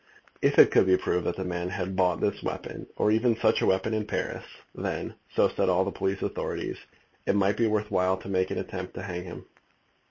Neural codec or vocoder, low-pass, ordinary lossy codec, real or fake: none; 7.2 kHz; MP3, 32 kbps; real